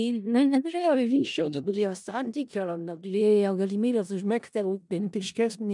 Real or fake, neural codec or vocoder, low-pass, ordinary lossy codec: fake; codec, 16 kHz in and 24 kHz out, 0.4 kbps, LongCat-Audio-Codec, four codebook decoder; 10.8 kHz; MP3, 96 kbps